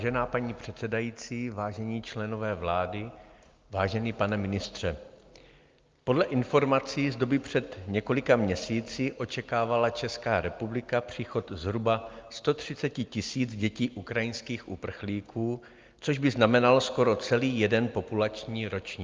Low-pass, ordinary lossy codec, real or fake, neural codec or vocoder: 7.2 kHz; Opus, 32 kbps; real; none